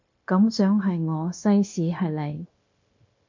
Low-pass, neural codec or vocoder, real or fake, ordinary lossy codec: 7.2 kHz; codec, 16 kHz, 0.9 kbps, LongCat-Audio-Codec; fake; MP3, 48 kbps